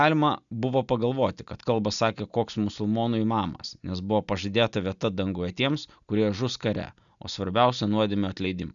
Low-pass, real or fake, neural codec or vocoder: 7.2 kHz; real; none